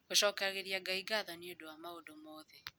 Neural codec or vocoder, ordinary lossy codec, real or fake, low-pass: none; none; real; none